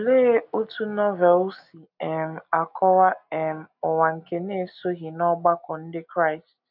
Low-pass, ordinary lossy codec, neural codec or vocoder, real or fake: 5.4 kHz; none; none; real